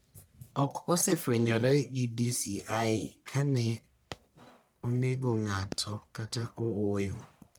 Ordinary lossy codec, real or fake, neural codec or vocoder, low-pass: none; fake; codec, 44.1 kHz, 1.7 kbps, Pupu-Codec; none